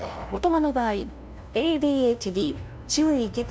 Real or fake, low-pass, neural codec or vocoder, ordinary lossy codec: fake; none; codec, 16 kHz, 0.5 kbps, FunCodec, trained on LibriTTS, 25 frames a second; none